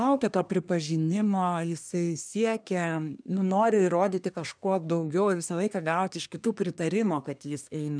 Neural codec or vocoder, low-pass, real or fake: codec, 24 kHz, 1 kbps, SNAC; 9.9 kHz; fake